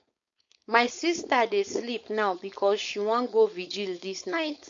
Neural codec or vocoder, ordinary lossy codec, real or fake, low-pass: codec, 16 kHz, 4.8 kbps, FACodec; MP3, 48 kbps; fake; 7.2 kHz